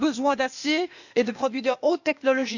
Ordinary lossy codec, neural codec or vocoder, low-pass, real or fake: none; codec, 16 kHz, 0.8 kbps, ZipCodec; 7.2 kHz; fake